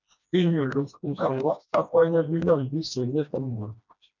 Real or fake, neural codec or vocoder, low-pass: fake; codec, 16 kHz, 1 kbps, FreqCodec, smaller model; 7.2 kHz